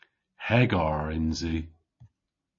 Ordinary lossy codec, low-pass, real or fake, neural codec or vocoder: MP3, 32 kbps; 7.2 kHz; real; none